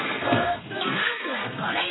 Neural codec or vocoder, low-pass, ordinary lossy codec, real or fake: codec, 32 kHz, 1.9 kbps, SNAC; 7.2 kHz; AAC, 16 kbps; fake